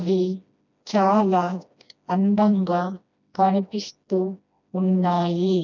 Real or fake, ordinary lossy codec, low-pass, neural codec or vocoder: fake; none; 7.2 kHz; codec, 16 kHz, 1 kbps, FreqCodec, smaller model